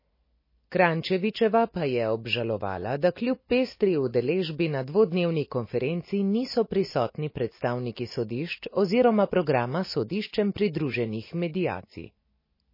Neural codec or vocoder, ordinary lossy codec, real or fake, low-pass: none; MP3, 24 kbps; real; 5.4 kHz